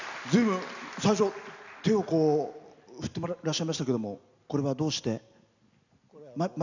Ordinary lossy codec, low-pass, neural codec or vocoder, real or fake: none; 7.2 kHz; none; real